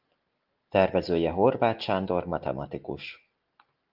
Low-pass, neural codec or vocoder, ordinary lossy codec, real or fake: 5.4 kHz; none; Opus, 32 kbps; real